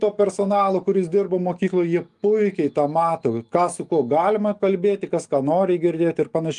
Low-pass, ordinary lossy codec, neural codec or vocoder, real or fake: 10.8 kHz; Opus, 32 kbps; autoencoder, 48 kHz, 128 numbers a frame, DAC-VAE, trained on Japanese speech; fake